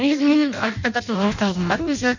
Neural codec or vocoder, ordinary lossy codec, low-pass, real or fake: codec, 16 kHz in and 24 kHz out, 0.6 kbps, FireRedTTS-2 codec; none; 7.2 kHz; fake